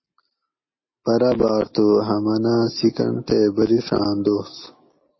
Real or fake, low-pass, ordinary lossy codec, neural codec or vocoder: real; 7.2 kHz; MP3, 24 kbps; none